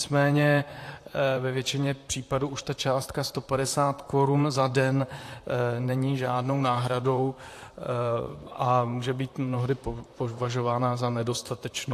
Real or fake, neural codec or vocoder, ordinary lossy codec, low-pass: fake; vocoder, 44.1 kHz, 128 mel bands, Pupu-Vocoder; AAC, 64 kbps; 14.4 kHz